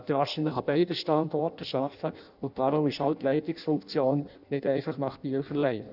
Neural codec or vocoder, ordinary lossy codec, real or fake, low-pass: codec, 16 kHz in and 24 kHz out, 0.6 kbps, FireRedTTS-2 codec; none; fake; 5.4 kHz